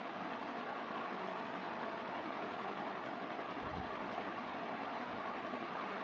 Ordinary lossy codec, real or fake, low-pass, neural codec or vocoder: none; fake; none; codec, 16 kHz, 8 kbps, FreqCodec, larger model